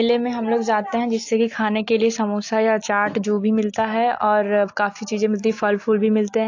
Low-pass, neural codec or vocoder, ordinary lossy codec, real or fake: 7.2 kHz; none; AAC, 48 kbps; real